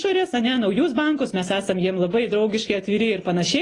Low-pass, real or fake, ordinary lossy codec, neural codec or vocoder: 10.8 kHz; real; AAC, 32 kbps; none